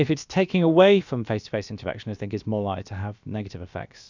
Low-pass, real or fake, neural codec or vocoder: 7.2 kHz; fake; codec, 16 kHz, about 1 kbps, DyCAST, with the encoder's durations